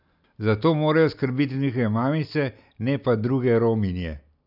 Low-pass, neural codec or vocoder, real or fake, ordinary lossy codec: 5.4 kHz; none; real; none